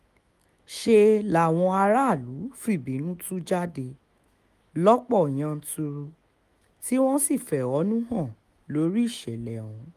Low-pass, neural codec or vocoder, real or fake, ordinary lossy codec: 14.4 kHz; autoencoder, 48 kHz, 128 numbers a frame, DAC-VAE, trained on Japanese speech; fake; Opus, 32 kbps